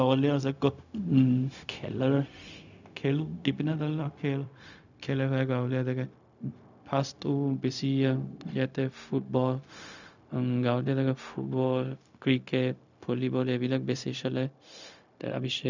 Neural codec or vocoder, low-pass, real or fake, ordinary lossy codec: codec, 16 kHz, 0.4 kbps, LongCat-Audio-Codec; 7.2 kHz; fake; none